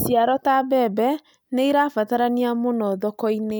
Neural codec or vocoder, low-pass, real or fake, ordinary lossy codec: none; none; real; none